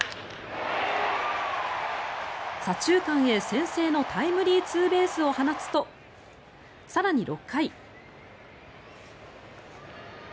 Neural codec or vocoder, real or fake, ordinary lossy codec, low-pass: none; real; none; none